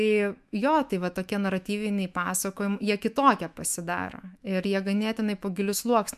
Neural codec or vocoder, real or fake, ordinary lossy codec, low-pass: autoencoder, 48 kHz, 128 numbers a frame, DAC-VAE, trained on Japanese speech; fake; MP3, 96 kbps; 14.4 kHz